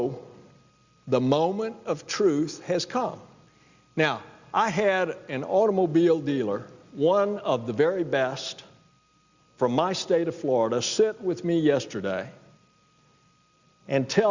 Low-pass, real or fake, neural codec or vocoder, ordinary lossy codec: 7.2 kHz; real; none; Opus, 64 kbps